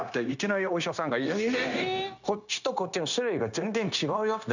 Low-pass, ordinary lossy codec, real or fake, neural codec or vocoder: 7.2 kHz; none; fake; codec, 16 kHz, 0.9 kbps, LongCat-Audio-Codec